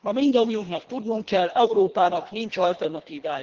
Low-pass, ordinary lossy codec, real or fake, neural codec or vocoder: 7.2 kHz; Opus, 16 kbps; fake; codec, 24 kHz, 1.5 kbps, HILCodec